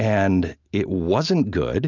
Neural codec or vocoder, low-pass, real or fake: none; 7.2 kHz; real